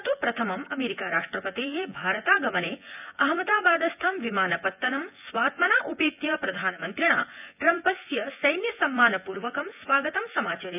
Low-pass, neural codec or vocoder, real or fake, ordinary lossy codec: 3.6 kHz; vocoder, 24 kHz, 100 mel bands, Vocos; fake; none